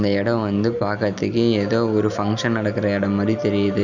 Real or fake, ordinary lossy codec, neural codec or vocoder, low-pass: real; none; none; 7.2 kHz